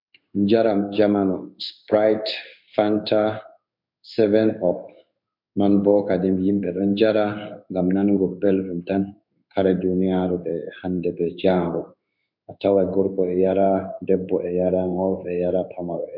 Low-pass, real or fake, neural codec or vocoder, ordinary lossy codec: 5.4 kHz; fake; codec, 16 kHz in and 24 kHz out, 1 kbps, XY-Tokenizer; MP3, 48 kbps